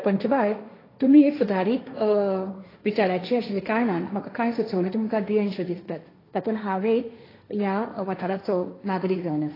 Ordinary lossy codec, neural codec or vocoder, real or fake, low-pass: AAC, 24 kbps; codec, 16 kHz, 1.1 kbps, Voila-Tokenizer; fake; 5.4 kHz